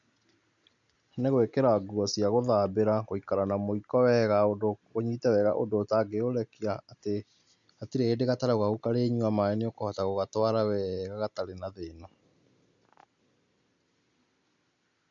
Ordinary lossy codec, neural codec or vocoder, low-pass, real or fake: none; none; 7.2 kHz; real